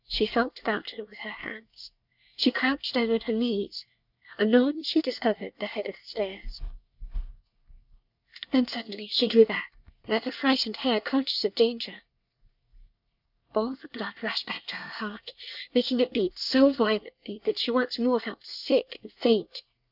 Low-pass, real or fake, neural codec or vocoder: 5.4 kHz; fake; codec, 24 kHz, 1 kbps, SNAC